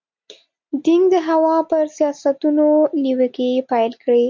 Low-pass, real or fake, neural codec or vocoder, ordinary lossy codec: 7.2 kHz; real; none; MP3, 64 kbps